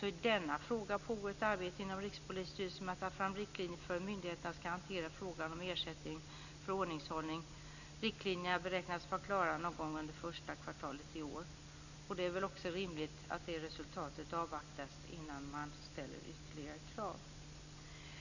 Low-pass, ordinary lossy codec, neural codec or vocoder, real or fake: 7.2 kHz; none; none; real